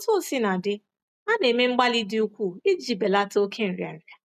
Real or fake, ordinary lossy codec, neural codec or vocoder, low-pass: real; none; none; 14.4 kHz